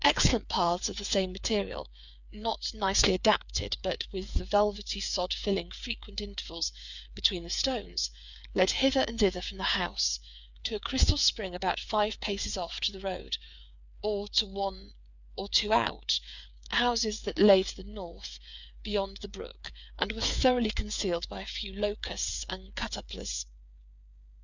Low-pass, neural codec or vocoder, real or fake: 7.2 kHz; codec, 16 kHz, 8 kbps, FreqCodec, smaller model; fake